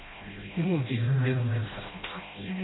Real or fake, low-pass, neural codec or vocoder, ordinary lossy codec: fake; 7.2 kHz; codec, 16 kHz, 0.5 kbps, FreqCodec, smaller model; AAC, 16 kbps